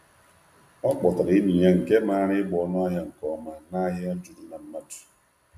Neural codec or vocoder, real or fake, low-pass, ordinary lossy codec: none; real; 14.4 kHz; MP3, 96 kbps